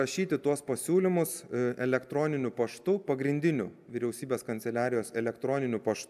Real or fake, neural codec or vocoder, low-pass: real; none; 14.4 kHz